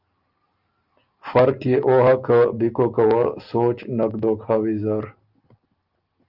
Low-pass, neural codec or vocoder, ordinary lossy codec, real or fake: 5.4 kHz; none; Opus, 32 kbps; real